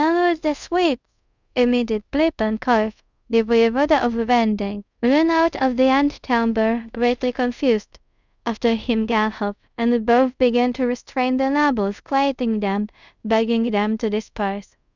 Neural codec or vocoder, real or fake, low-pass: codec, 24 kHz, 0.5 kbps, DualCodec; fake; 7.2 kHz